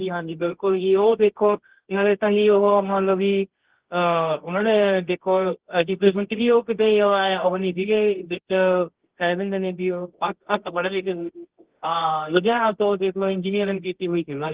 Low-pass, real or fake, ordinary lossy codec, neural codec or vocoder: 3.6 kHz; fake; Opus, 16 kbps; codec, 24 kHz, 0.9 kbps, WavTokenizer, medium music audio release